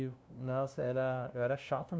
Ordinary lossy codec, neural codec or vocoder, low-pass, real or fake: none; codec, 16 kHz, 0.5 kbps, FunCodec, trained on LibriTTS, 25 frames a second; none; fake